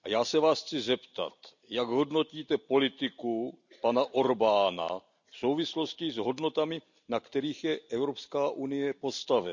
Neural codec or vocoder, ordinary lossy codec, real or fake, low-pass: none; none; real; 7.2 kHz